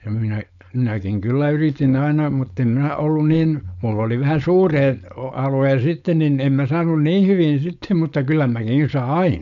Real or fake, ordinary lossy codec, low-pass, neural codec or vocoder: fake; none; 7.2 kHz; codec, 16 kHz, 4.8 kbps, FACodec